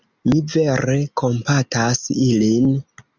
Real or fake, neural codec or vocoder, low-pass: real; none; 7.2 kHz